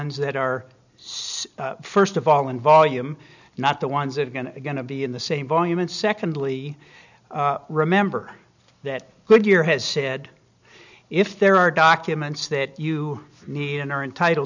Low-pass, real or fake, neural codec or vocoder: 7.2 kHz; real; none